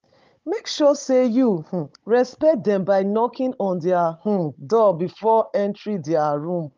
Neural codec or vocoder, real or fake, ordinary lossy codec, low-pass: codec, 16 kHz, 16 kbps, FunCodec, trained on Chinese and English, 50 frames a second; fake; Opus, 24 kbps; 7.2 kHz